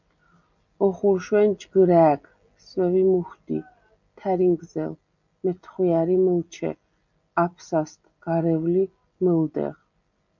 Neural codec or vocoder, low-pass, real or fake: none; 7.2 kHz; real